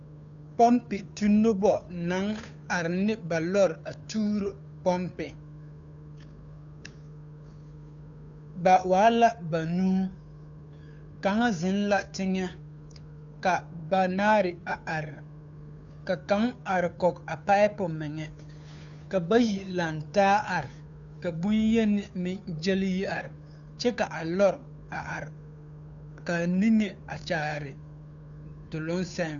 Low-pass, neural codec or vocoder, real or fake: 7.2 kHz; codec, 16 kHz, 2 kbps, FunCodec, trained on Chinese and English, 25 frames a second; fake